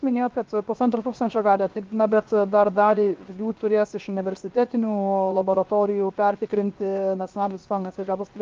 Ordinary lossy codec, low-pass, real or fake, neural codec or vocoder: Opus, 32 kbps; 7.2 kHz; fake; codec, 16 kHz, 0.7 kbps, FocalCodec